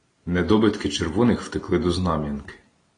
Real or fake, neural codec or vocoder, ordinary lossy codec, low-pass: real; none; AAC, 32 kbps; 9.9 kHz